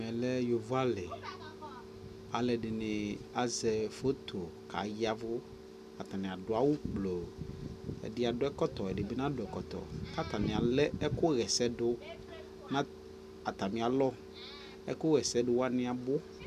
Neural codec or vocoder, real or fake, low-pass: none; real; 14.4 kHz